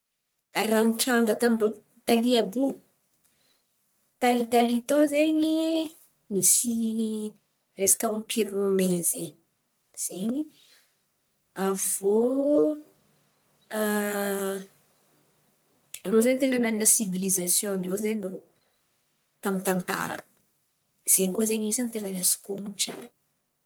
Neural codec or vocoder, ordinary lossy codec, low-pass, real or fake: codec, 44.1 kHz, 1.7 kbps, Pupu-Codec; none; none; fake